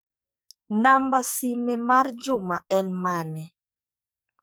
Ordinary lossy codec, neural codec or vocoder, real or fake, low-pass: none; codec, 44.1 kHz, 2.6 kbps, SNAC; fake; none